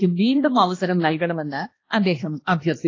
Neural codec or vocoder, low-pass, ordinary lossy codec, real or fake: codec, 16 kHz, 1 kbps, X-Codec, HuBERT features, trained on balanced general audio; 7.2 kHz; AAC, 32 kbps; fake